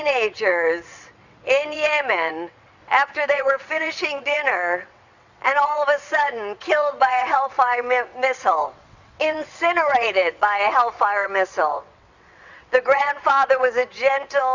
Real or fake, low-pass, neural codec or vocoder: fake; 7.2 kHz; vocoder, 22.05 kHz, 80 mel bands, WaveNeXt